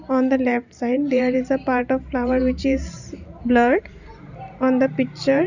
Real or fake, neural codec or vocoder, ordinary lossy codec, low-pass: fake; vocoder, 44.1 kHz, 128 mel bands every 512 samples, BigVGAN v2; none; 7.2 kHz